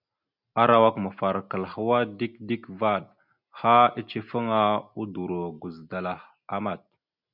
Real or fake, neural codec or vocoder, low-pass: real; none; 5.4 kHz